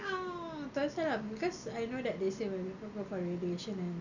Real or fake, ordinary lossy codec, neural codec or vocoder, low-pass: real; none; none; 7.2 kHz